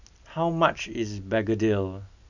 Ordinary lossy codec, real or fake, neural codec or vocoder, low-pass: none; real; none; 7.2 kHz